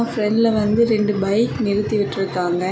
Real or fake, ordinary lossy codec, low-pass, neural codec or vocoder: real; none; none; none